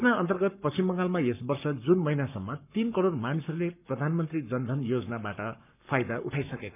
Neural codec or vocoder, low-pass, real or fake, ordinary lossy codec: codec, 44.1 kHz, 7.8 kbps, Pupu-Codec; 3.6 kHz; fake; none